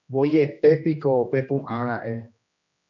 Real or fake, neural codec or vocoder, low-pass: fake; codec, 16 kHz, 1 kbps, X-Codec, HuBERT features, trained on general audio; 7.2 kHz